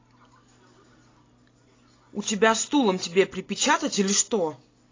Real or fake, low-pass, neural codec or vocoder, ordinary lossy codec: real; 7.2 kHz; none; AAC, 32 kbps